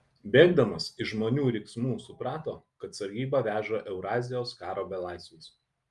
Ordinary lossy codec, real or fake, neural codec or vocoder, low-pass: Opus, 32 kbps; real; none; 10.8 kHz